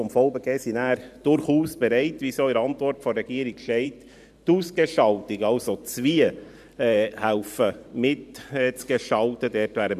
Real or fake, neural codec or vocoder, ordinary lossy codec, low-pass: fake; vocoder, 44.1 kHz, 128 mel bands every 512 samples, BigVGAN v2; none; 14.4 kHz